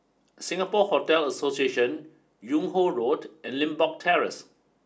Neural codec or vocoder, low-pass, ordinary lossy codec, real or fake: none; none; none; real